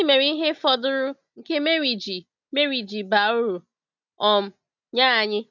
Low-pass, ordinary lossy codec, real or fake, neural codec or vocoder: 7.2 kHz; none; real; none